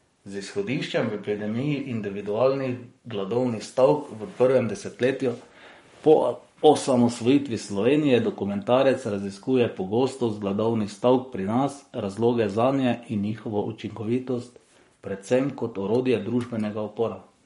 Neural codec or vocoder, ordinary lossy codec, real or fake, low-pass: codec, 44.1 kHz, 7.8 kbps, Pupu-Codec; MP3, 48 kbps; fake; 19.8 kHz